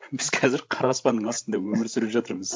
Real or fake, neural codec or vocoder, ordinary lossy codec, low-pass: fake; codec, 16 kHz, 16 kbps, FreqCodec, larger model; none; none